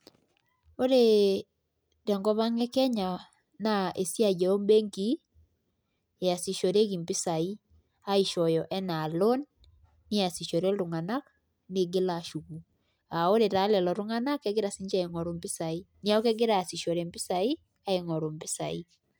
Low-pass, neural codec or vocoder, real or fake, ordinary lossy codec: none; none; real; none